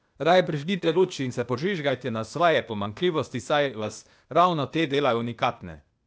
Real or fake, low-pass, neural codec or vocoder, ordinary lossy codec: fake; none; codec, 16 kHz, 0.8 kbps, ZipCodec; none